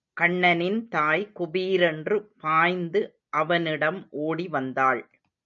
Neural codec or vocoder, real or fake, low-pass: none; real; 7.2 kHz